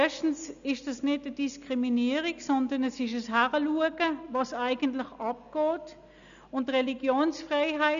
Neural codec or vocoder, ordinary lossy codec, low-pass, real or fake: none; none; 7.2 kHz; real